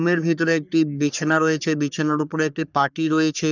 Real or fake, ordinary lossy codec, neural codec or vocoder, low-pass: fake; none; codec, 44.1 kHz, 3.4 kbps, Pupu-Codec; 7.2 kHz